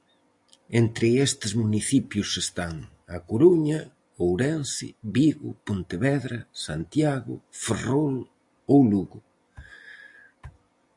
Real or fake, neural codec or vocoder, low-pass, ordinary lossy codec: real; none; 10.8 kHz; AAC, 64 kbps